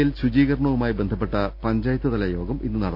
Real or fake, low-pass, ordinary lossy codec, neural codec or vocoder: real; 5.4 kHz; none; none